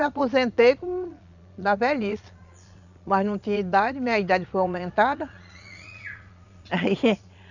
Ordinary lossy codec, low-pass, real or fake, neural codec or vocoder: none; 7.2 kHz; fake; vocoder, 22.05 kHz, 80 mel bands, Vocos